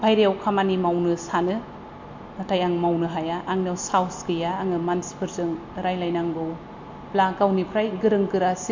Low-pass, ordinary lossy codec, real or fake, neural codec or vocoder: 7.2 kHz; MP3, 48 kbps; real; none